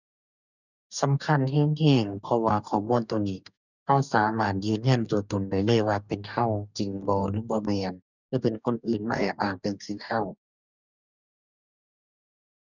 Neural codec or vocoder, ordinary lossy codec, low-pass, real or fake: codec, 44.1 kHz, 2.6 kbps, DAC; none; 7.2 kHz; fake